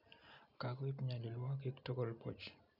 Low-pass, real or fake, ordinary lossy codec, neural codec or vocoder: 5.4 kHz; real; none; none